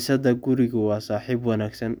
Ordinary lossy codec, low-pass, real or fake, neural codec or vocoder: none; none; real; none